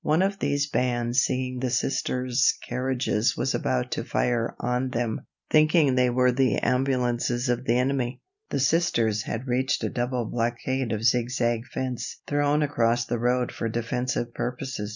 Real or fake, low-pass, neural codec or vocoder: real; 7.2 kHz; none